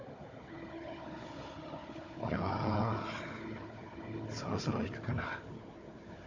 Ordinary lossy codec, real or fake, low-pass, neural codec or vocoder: MP3, 48 kbps; fake; 7.2 kHz; codec, 16 kHz, 4 kbps, FunCodec, trained on Chinese and English, 50 frames a second